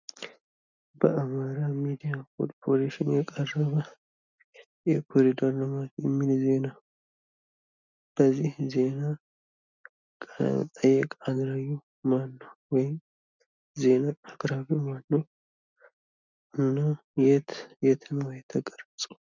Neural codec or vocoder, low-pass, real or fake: none; 7.2 kHz; real